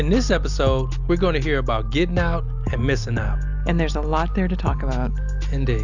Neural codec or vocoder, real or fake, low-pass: none; real; 7.2 kHz